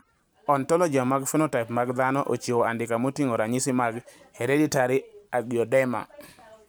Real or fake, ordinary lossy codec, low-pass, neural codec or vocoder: fake; none; none; vocoder, 44.1 kHz, 128 mel bands every 512 samples, BigVGAN v2